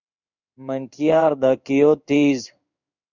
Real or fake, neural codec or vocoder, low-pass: fake; codec, 16 kHz in and 24 kHz out, 1 kbps, XY-Tokenizer; 7.2 kHz